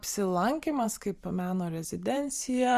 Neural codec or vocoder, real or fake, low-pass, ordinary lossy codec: vocoder, 44.1 kHz, 128 mel bands every 512 samples, BigVGAN v2; fake; 14.4 kHz; Opus, 64 kbps